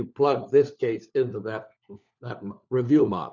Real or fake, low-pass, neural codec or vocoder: fake; 7.2 kHz; codec, 16 kHz, 4 kbps, FunCodec, trained on LibriTTS, 50 frames a second